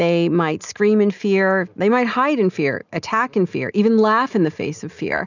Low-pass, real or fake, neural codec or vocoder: 7.2 kHz; real; none